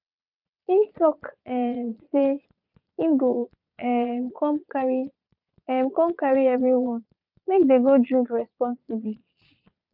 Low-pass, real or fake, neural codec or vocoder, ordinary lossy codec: 5.4 kHz; fake; vocoder, 22.05 kHz, 80 mel bands, Vocos; none